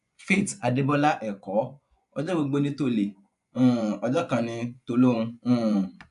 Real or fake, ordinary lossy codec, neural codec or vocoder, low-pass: fake; none; vocoder, 24 kHz, 100 mel bands, Vocos; 10.8 kHz